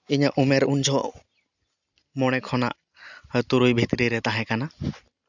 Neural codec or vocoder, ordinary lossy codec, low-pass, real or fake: none; none; 7.2 kHz; real